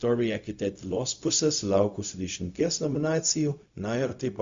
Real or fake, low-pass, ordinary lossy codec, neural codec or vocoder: fake; 7.2 kHz; Opus, 64 kbps; codec, 16 kHz, 0.4 kbps, LongCat-Audio-Codec